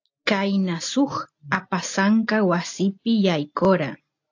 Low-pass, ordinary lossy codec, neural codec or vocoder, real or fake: 7.2 kHz; AAC, 48 kbps; none; real